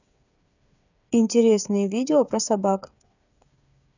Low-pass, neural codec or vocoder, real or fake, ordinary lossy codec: 7.2 kHz; codec, 16 kHz, 8 kbps, FreqCodec, smaller model; fake; none